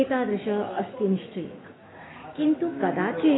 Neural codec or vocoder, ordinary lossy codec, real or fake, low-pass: autoencoder, 48 kHz, 128 numbers a frame, DAC-VAE, trained on Japanese speech; AAC, 16 kbps; fake; 7.2 kHz